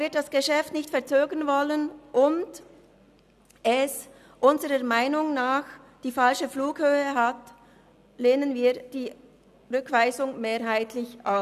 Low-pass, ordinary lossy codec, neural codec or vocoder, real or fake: 14.4 kHz; none; none; real